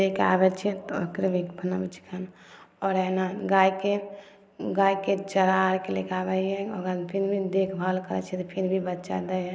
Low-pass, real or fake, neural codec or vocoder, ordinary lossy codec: none; real; none; none